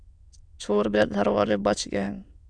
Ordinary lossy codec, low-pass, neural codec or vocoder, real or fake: Opus, 64 kbps; 9.9 kHz; autoencoder, 22.05 kHz, a latent of 192 numbers a frame, VITS, trained on many speakers; fake